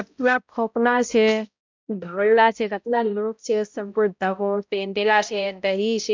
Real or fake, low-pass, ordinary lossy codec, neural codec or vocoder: fake; 7.2 kHz; MP3, 64 kbps; codec, 16 kHz, 0.5 kbps, X-Codec, HuBERT features, trained on balanced general audio